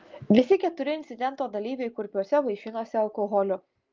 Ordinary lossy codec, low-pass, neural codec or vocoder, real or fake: Opus, 32 kbps; 7.2 kHz; none; real